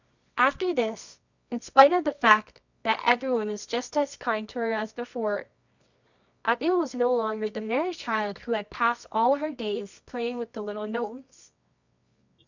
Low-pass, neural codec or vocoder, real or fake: 7.2 kHz; codec, 24 kHz, 0.9 kbps, WavTokenizer, medium music audio release; fake